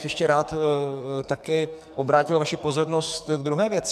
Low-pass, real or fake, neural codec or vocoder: 14.4 kHz; fake; codec, 44.1 kHz, 2.6 kbps, SNAC